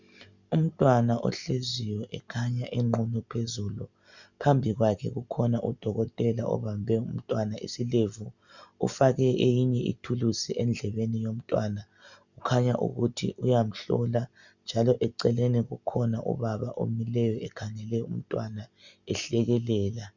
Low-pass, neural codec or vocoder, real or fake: 7.2 kHz; none; real